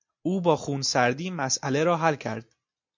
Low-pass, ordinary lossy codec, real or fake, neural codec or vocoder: 7.2 kHz; MP3, 48 kbps; real; none